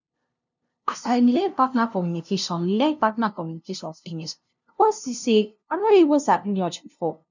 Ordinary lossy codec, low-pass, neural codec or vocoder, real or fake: none; 7.2 kHz; codec, 16 kHz, 0.5 kbps, FunCodec, trained on LibriTTS, 25 frames a second; fake